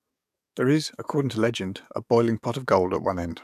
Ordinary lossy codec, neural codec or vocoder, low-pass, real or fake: none; codec, 44.1 kHz, 7.8 kbps, DAC; 14.4 kHz; fake